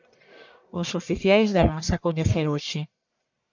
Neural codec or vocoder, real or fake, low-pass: codec, 44.1 kHz, 1.7 kbps, Pupu-Codec; fake; 7.2 kHz